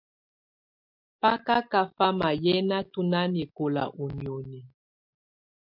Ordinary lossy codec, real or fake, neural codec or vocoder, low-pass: MP3, 48 kbps; real; none; 5.4 kHz